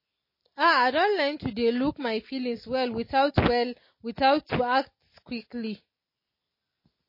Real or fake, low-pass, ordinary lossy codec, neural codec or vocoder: real; 5.4 kHz; MP3, 24 kbps; none